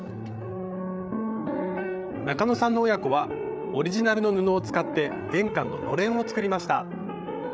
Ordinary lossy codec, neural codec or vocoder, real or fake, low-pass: none; codec, 16 kHz, 8 kbps, FreqCodec, larger model; fake; none